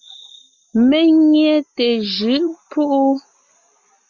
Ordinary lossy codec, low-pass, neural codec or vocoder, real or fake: Opus, 64 kbps; 7.2 kHz; none; real